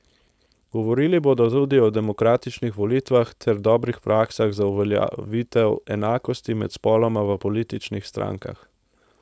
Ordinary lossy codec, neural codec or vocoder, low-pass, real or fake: none; codec, 16 kHz, 4.8 kbps, FACodec; none; fake